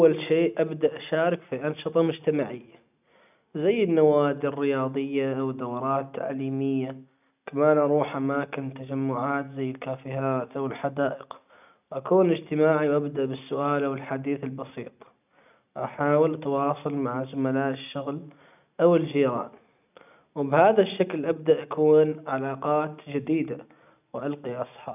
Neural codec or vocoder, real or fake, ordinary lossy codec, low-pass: none; real; none; 3.6 kHz